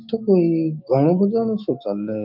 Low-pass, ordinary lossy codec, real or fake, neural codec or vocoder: 5.4 kHz; none; fake; codec, 16 kHz, 6 kbps, DAC